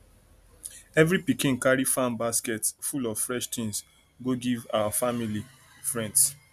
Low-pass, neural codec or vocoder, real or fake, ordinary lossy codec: 14.4 kHz; none; real; none